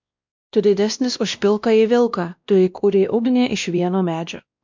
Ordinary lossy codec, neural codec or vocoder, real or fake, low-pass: MP3, 64 kbps; codec, 16 kHz, 1 kbps, X-Codec, WavLM features, trained on Multilingual LibriSpeech; fake; 7.2 kHz